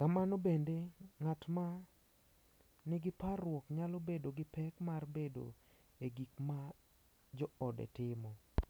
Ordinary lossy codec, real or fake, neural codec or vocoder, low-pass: none; real; none; none